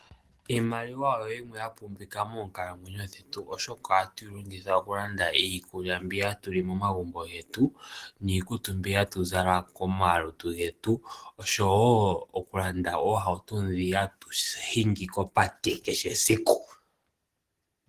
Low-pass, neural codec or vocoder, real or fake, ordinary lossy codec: 14.4 kHz; none; real; Opus, 16 kbps